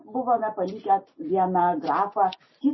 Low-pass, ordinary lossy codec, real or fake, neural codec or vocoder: 7.2 kHz; MP3, 24 kbps; real; none